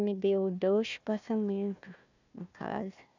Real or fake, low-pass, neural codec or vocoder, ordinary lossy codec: fake; 7.2 kHz; codec, 16 kHz, 1 kbps, FunCodec, trained on Chinese and English, 50 frames a second; none